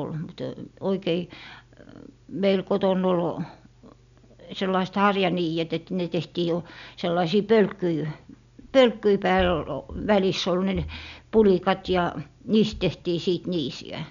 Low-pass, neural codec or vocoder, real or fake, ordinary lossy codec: 7.2 kHz; none; real; none